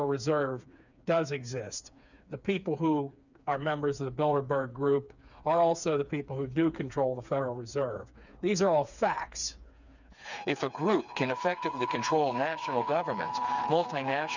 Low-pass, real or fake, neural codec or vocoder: 7.2 kHz; fake; codec, 16 kHz, 4 kbps, FreqCodec, smaller model